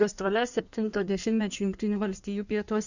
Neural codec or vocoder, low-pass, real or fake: codec, 16 kHz in and 24 kHz out, 1.1 kbps, FireRedTTS-2 codec; 7.2 kHz; fake